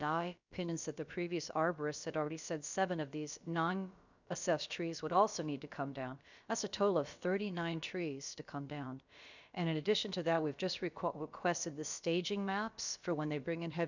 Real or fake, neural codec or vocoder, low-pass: fake; codec, 16 kHz, about 1 kbps, DyCAST, with the encoder's durations; 7.2 kHz